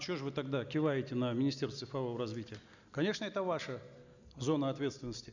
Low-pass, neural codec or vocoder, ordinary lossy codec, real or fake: 7.2 kHz; none; none; real